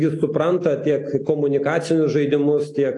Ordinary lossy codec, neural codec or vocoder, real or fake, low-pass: AAC, 48 kbps; none; real; 10.8 kHz